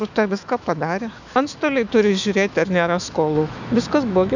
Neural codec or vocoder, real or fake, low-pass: autoencoder, 48 kHz, 128 numbers a frame, DAC-VAE, trained on Japanese speech; fake; 7.2 kHz